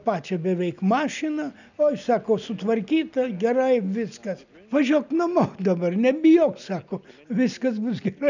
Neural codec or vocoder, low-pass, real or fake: none; 7.2 kHz; real